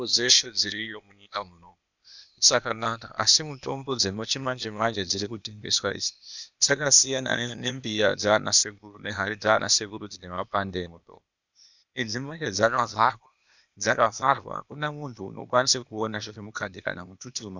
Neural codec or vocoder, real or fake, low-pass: codec, 16 kHz, 0.8 kbps, ZipCodec; fake; 7.2 kHz